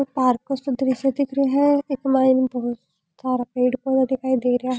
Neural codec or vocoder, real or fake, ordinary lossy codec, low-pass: none; real; none; none